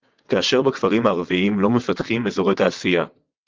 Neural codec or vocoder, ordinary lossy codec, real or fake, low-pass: vocoder, 22.05 kHz, 80 mel bands, WaveNeXt; Opus, 32 kbps; fake; 7.2 kHz